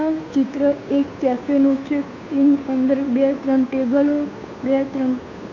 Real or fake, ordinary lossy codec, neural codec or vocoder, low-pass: fake; AAC, 48 kbps; codec, 16 kHz, 2 kbps, FunCodec, trained on Chinese and English, 25 frames a second; 7.2 kHz